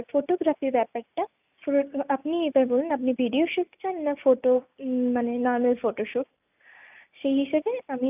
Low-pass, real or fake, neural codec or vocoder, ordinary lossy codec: 3.6 kHz; real; none; none